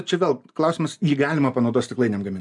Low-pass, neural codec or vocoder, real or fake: 10.8 kHz; none; real